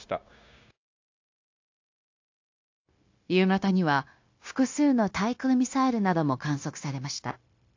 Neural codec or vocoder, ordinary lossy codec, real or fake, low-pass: codec, 16 kHz, 0.9 kbps, LongCat-Audio-Codec; AAC, 48 kbps; fake; 7.2 kHz